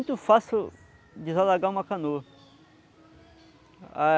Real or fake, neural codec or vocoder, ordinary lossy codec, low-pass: real; none; none; none